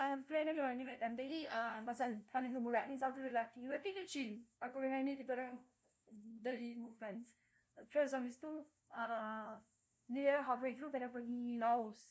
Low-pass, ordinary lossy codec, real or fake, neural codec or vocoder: none; none; fake; codec, 16 kHz, 0.5 kbps, FunCodec, trained on LibriTTS, 25 frames a second